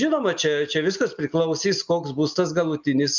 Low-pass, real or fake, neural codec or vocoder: 7.2 kHz; real; none